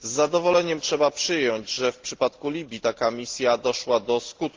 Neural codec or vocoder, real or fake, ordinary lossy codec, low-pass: none; real; Opus, 16 kbps; 7.2 kHz